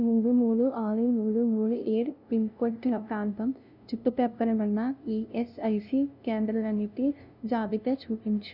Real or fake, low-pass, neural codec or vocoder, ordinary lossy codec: fake; 5.4 kHz; codec, 16 kHz, 0.5 kbps, FunCodec, trained on LibriTTS, 25 frames a second; none